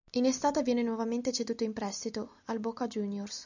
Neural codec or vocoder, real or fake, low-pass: none; real; 7.2 kHz